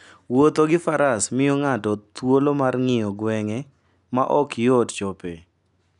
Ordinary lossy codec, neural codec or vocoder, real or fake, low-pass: none; none; real; 10.8 kHz